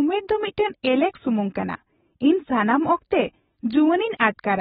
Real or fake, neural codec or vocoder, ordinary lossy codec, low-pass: real; none; AAC, 16 kbps; 7.2 kHz